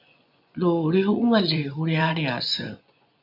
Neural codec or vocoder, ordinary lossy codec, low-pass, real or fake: vocoder, 24 kHz, 100 mel bands, Vocos; AAC, 48 kbps; 5.4 kHz; fake